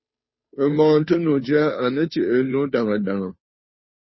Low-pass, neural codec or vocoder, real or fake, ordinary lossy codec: 7.2 kHz; codec, 16 kHz, 2 kbps, FunCodec, trained on Chinese and English, 25 frames a second; fake; MP3, 24 kbps